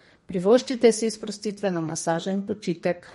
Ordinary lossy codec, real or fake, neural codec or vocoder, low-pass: MP3, 48 kbps; fake; codec, 32 kHz, 1.9 kbps, SNAC; 14.4 kHz